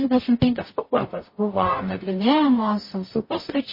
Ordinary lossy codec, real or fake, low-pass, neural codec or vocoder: MP3, 24 kbps; fake; 5.4 kHz; codec, 44.1 kHz, 0.9 kbps, DAC